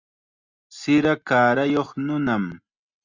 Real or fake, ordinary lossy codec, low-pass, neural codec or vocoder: real; Opus, 64 kbps; 7.2 kHz; none